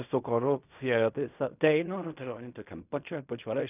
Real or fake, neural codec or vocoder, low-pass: fake; codec, 16 kHz in and 24 kHz out, 0.4 kbps, LongCat-Audio-Codec, fine tuned four codebook decoder; 3.6 kHz